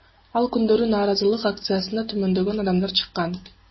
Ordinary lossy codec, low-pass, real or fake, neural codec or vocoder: MP3, 24 kbps; 7.2 kHz; real; none